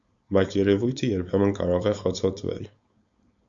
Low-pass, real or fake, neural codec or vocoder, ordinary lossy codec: 7.2 kHz; fake; codec, 16 kHz, 4.8 kbps, FACodec; Opus, 64 kbps